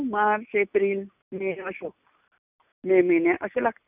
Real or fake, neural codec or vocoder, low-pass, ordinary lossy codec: real; none; 3.6 kHz; none